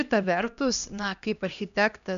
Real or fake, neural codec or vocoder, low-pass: fake; codec, 16 kHz, 1 kbps, X-Codec, HuBERT features, trained on LibriSpeech; 7.2 kHz